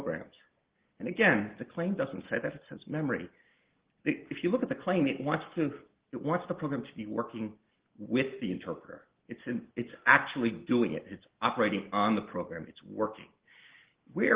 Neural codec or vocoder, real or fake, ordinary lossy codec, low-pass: none; real; Opus, 16 kbps; 3.6 kHz